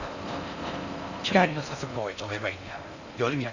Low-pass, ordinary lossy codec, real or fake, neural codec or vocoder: 7.2 kHz; none; fake; codec, 16 kHz in and 24 kHz out, 0.6 kbps, FocalCodec, streaming, 4096 codes